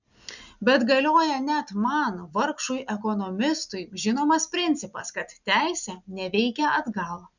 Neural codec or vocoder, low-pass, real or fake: none; 7.2 kHz; real